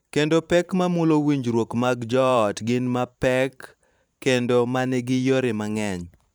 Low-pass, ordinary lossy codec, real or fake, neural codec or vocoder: none; none; fake; vocoder, 44.1 kHz, 128 mel bands every 512 samples, BigVGAN v2